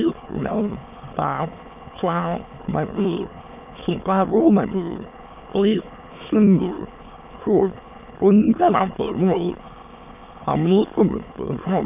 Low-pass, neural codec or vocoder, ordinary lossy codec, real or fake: 3.6 kHz; autoencoder, 22.05 kHz, a latent of 192 numbers a frame, VITS, trained on many speakers; none; fake